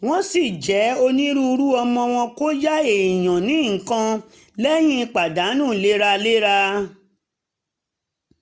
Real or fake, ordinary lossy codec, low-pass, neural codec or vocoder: real; none; none; none